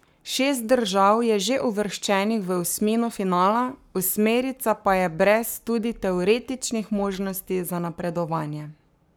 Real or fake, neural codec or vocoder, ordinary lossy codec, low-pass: fake; codec, 44.1 kHz, 7.8 kbps, Pupu-Codec; none; none